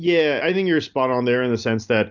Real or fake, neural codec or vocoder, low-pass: real; none; 7.2 kHz